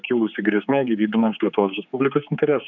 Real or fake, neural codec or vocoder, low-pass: fake; codec, 16 kHz, 4 kbps, X-Codec, HuBERT features, trained on general audio; 7.2 kHz